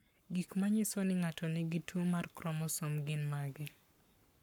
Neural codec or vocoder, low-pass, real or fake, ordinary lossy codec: codec, 44.1 kHz, 7.8 kbps, Pupu-Codec; none; fake; none